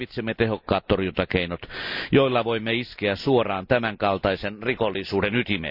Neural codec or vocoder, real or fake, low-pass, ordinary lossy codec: none; real; 5.4 kHz; none